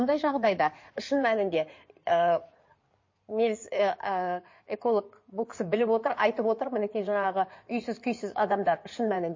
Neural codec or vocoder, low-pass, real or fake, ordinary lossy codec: codec, 16 kHz in and 24 kHz out, 2.2 kbps, FireRedTTS-2 codec; 7.2 kHz; fake; MP3, 32 kbps